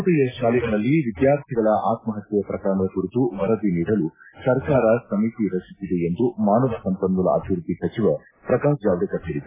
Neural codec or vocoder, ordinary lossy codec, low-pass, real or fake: none; AAC, 16 kbps; 3.6 kHz; real